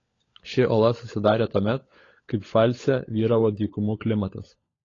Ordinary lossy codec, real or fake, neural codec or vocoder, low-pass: AAC, 32 kbps; fake; codec, 16 kHz, 16 kbps, FunCodec, trained on LibriTTS, 50 frames a second; 7.2 kHz